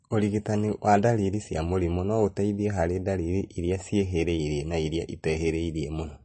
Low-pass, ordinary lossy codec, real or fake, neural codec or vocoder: 10.8 kHz; MP3, 32 kbps; real; none